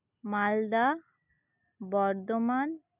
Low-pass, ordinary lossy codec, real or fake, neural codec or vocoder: 3.6 kHz; none; real; none